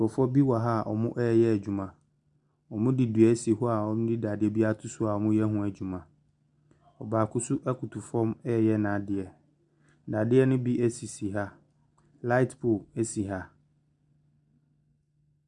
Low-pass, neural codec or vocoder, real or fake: 10.8 kHz; none; real